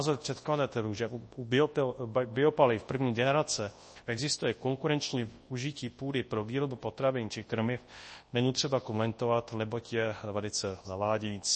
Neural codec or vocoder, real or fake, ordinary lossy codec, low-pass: codec, 24 kHz, 0.9 kbps, WavTokenizer, large speech release; fake; MP3, 32 kbps; 10.8 kHz